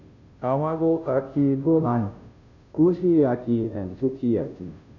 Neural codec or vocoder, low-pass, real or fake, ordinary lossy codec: codec, 16 kHz, 0.5 kbps, FunCodec, trained on Chinese and English, 25 frames a second; 7.2 kHz; fake; none